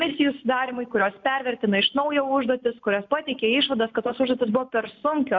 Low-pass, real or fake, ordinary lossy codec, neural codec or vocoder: 7.2 kHz; real; MP3, 64 kbps; none